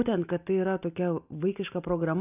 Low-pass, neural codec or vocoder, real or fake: 3.6 kHz; none; real